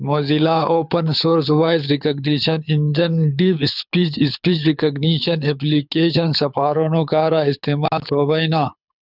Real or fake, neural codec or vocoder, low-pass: fake; codec, 24 kHz, 6 kbps, HILCodec; 5.4 kHz